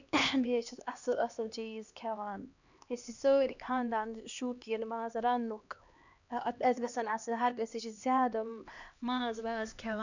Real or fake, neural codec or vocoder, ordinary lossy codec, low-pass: fake; codec, 16 kHz, 2 kbps, X-Codec, HuBERT features, trained on LibriSpeech; none; 7.2 kHz